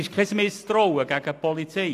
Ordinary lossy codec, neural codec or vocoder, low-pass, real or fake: AAC, 64 kbps; none; 14.4 kHz; real